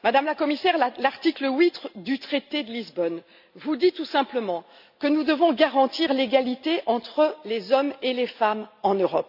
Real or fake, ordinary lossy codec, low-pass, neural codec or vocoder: real; AAC, 48 kbps; 5.4 kHz; none